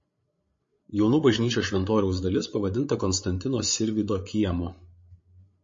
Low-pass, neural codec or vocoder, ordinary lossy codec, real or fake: 7.2 kHz; codec, 16 kHz, 8 kbps, FreqCodec, larger model; MP3, 32 kbps; fake